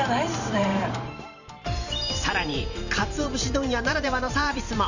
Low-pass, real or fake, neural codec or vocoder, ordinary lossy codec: 7.2 kHz; fake; vocoder, 44.1 kHz, 128 mel bands every 512 samples, BigVGAN v2; none